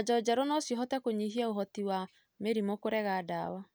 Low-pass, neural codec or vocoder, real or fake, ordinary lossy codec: none; none; real; none